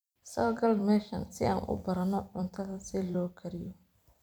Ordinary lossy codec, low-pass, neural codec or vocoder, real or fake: none; none; vocoder, 44.1 kHz, 128 mel bands every 256 samples, BigVGAN v2; fake